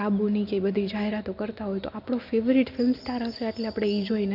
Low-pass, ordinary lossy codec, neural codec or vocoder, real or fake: 5.4 kHz; none; none; real